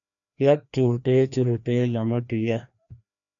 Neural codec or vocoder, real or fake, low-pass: codec, 16 kHz, 1 kbps, FreqCodec, larger model; fake; 7.2 kHz